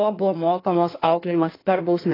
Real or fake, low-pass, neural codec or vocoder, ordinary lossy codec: fake; 5.4 kHz; codec, 16 kHz in and 24 kHz out, 1.1 kbps, FireRedTTS-2 codec; AAC, 24 kbps